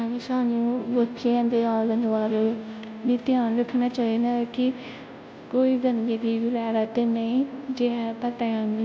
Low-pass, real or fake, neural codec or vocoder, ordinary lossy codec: none; fake; codec, 16 kHz, 0.5 kbps, FunCodec, trained on Chinese and English, 25 frames a second; none